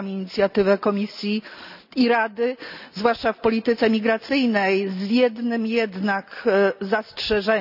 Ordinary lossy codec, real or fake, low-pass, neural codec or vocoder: none; real; 5.4 kHz; none